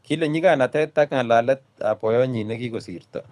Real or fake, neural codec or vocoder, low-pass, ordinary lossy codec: fake; codec, 24 kHz, 6 kbps, HILCodec; none; none